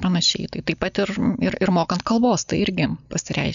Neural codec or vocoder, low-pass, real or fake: none; 7.2 kHz; real